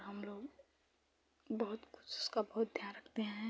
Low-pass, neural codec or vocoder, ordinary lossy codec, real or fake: none; none; none; real